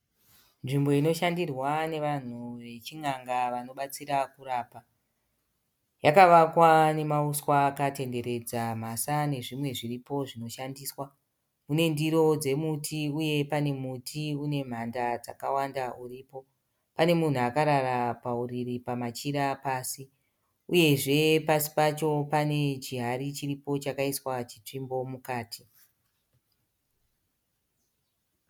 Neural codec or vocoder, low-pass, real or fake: none; 19.8 kHz; real